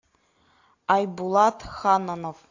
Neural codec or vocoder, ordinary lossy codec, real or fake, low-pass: none; AAC, 48 kbps; real; 7.2 kHz